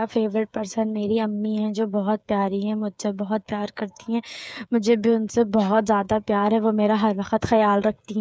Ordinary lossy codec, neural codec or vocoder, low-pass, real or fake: none; codec, 16 kHz, 8 kbps, FreqCodec, smaller model; none; fake